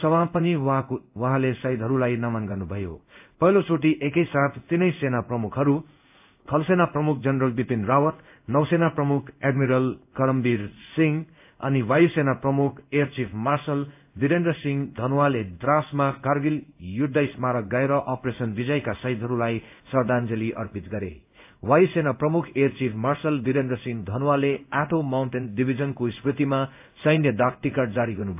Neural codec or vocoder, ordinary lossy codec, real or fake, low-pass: codec, 16 kHz in and 24 kHz out, 1 kbps, XY-Tokenizer; AAC, 32 kbps; fake; 3.6 kHz